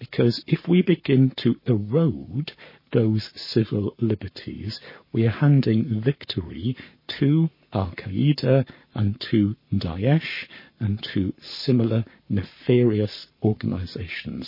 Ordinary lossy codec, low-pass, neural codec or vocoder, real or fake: MP3, 24 kbps; 5.4 kHz; codec, 44.1 kHz, 7.8 kbps, Pupu-Codec; fake